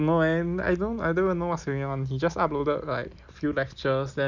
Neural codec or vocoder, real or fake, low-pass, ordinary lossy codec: none; real; 7.2 kHz; none